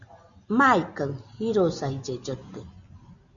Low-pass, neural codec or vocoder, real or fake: 7.2 kHz; none; real